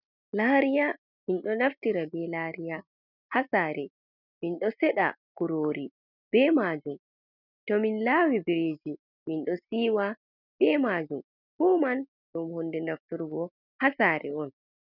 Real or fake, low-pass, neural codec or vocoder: real; 5.4 kHz; none